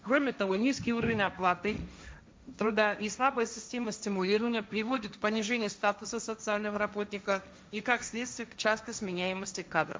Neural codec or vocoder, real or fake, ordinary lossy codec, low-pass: codec, 16 kHz, 1.1 kbps, Voila-Tokenizer; fake; none; none